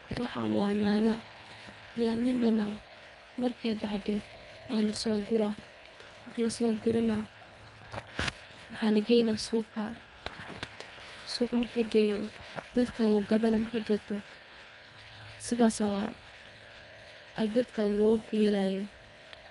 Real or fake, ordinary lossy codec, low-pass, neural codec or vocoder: fake; none; 10.8 kHz; codec, 24 kHz, 1.5 kbps, HILCodec